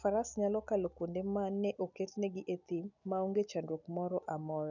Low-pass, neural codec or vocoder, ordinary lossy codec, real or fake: 7.2 kHz; none; none; real